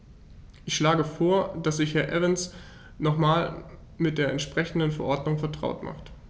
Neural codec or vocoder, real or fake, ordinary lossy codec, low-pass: none; real; none; none